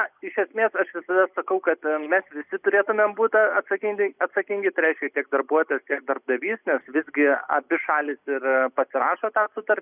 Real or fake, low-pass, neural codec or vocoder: real; 3.6 kHz; none